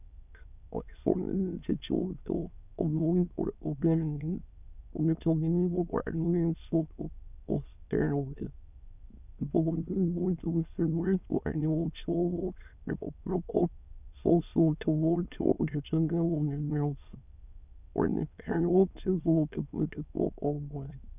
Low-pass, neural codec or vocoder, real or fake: 3.6 kHz; autoencoder, 22.05 kHz, a latent of 192 numbers a frame, VITS, trained on many speakers; fake